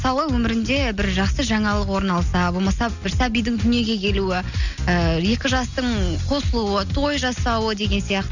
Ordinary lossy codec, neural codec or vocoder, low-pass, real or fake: none; none; 7.2 kHz; real